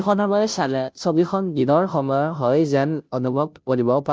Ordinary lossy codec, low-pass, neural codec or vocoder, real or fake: none; none; codec, 16 kHz, 0.5 kbps, FunCodec, trained on Chinese and English, 25 frames a second; fake